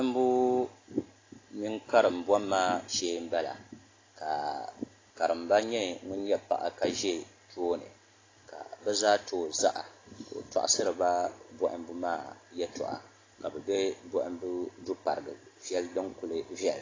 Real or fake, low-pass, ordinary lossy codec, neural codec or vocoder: real; 7.2 kHz; AAC, 32 kbps; none